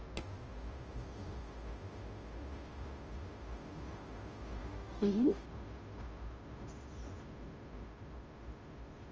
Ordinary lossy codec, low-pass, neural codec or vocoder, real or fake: Opus, 24 kbps; 7.2 kHz; codec, 16 kHz, 0.5 kbps, FunCodec, trained on Chinese and English, 25 frames a second; fake